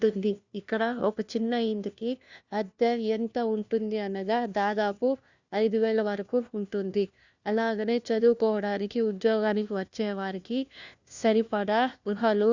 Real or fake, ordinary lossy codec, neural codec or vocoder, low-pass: fake; Opus, 64 kbps; codec, 16 kHz, 1 kbps, FunCodec, trained on LibriTTS, 50 frames a second; 7.2 kHz